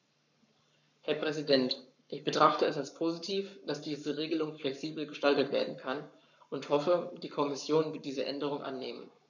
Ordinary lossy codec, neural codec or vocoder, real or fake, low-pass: none; codec, 44.1 kHz, 7.8 kbps, Pupu-Codec; fake; 7.2 kHz